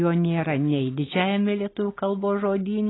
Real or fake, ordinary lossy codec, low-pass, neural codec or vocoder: real; AAC, 16 kbps; 7.2 kHz; none